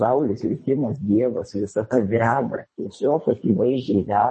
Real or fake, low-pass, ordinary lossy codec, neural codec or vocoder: fake; 10.8 kHz; MP3, 32 kbps; codec, 24 kHz, 3 kbps, HILCodec